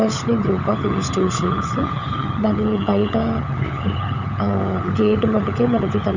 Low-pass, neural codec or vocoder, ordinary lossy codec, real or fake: 7.2 kHz; codec, 16 kHz, 16 kbps, FunCodec, trained on LibriTTS, 50 frames a second; none; fake